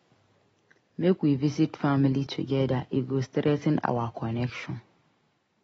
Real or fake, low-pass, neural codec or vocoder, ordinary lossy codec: real; 19.8 kHz; none; AAC, 24 kbps